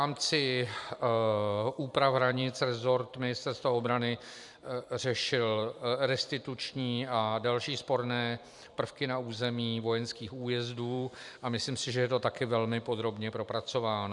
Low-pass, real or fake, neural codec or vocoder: 10.8 kHz; fake; vocoder, 44.1 kHz, 128 mel bands every 256 samples, BigVGAN v2